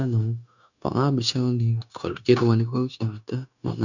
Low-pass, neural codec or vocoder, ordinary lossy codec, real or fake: 7.2 kHz; codec, 16 kHz, 0.9 kbps, LongCat-Audio-Codec; none; fake